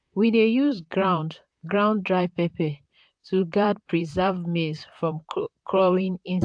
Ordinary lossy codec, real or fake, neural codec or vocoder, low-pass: AAC, 64 kbps; fake; vocoder, 44.1 kHz, 128 mel bands, Pupu-Vocoder; 9.9 kHz